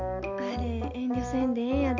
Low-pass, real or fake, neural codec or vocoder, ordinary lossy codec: 7.2 kHz; real; none; MP3, 64 kbps